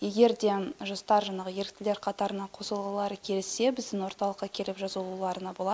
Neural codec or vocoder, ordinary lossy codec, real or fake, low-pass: none; none; real; none